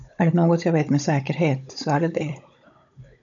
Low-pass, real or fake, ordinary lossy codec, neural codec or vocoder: 7.2 kHz; fake; MP3, 96 kbps; codec, 16 kHz, 16 kbps, FunCodec, trained on Chinese and English, 50 frames a second